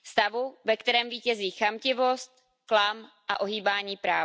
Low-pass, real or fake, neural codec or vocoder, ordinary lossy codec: none; real; none; none